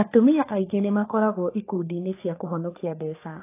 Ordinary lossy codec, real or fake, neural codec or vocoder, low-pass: AAC, 24 kbps; fake; codec, 44.1 kHz, 3.4 kbps, Pupu-Codec; 3.6 kHz